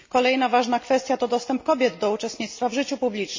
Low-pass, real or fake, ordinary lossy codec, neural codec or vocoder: 7.2 kHz; real; MP3, 32 kbps; none